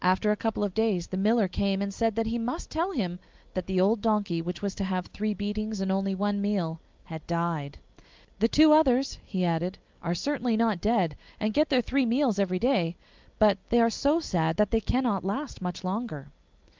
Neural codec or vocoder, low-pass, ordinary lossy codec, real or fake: none; 7.2 kHz; Opus, 32 kbps; real